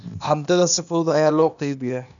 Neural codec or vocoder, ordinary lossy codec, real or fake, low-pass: codec, 16 kHz, 0.8 kbps, ZipCodec; none; fake; 7.2 kHz